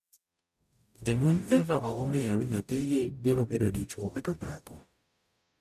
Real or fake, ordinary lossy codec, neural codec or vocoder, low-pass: fake; none; codec, 44.1 kHz, 0.9 kbps, DAC; 14.4 kHz